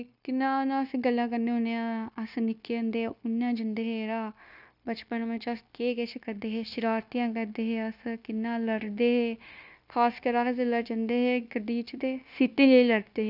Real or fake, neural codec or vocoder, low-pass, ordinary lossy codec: fake; codec, 16 kHz, 0.9 kbps, LongCat-Audio-Codec; 5.4 kHz; none